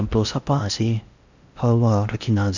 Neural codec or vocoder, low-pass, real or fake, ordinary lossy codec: codec, 16 kHz in and 24 kHz out, 0.6 kbps, FocalCodec, streaming, 4096 codes; 7.2 kHz; fake; Opus, 64 kbps